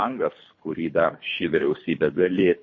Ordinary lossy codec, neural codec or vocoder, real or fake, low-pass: MP3, 32 kbps; codec, 24 kHz, 3 kbps, HILCodec; fake; 7.2 kHz